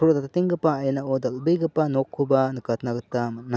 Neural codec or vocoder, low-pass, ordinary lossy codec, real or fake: none; none; none; real